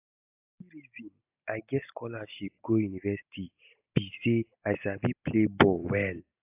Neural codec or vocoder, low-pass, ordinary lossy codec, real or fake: none; 3.6 kHz; AAC, 32 kbps; real